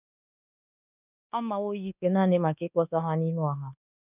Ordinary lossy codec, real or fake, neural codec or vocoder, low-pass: none; fake; codec, 24 kHz, 1.2 kbps, DualCodec; 3.6 kHz